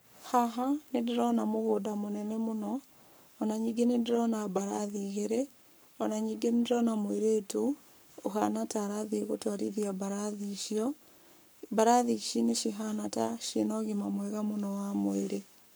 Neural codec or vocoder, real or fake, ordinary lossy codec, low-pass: codec, 44.1 kHz, 7.8 kbps, Pupu-Codec; fake; none; none